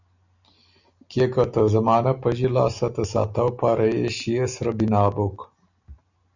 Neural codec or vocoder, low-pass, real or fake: none; 7.2 kHz; real